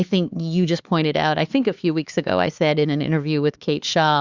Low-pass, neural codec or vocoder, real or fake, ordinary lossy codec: 7.2 kHz; codec, 16 kHz, 4 kbps, X-Codec, HuBERT features, trained on LibriSpeech; fake; Opus, 64 kbps